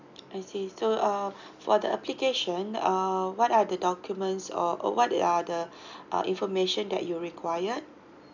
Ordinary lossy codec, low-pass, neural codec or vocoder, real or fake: none; 7.2 kHz; none; real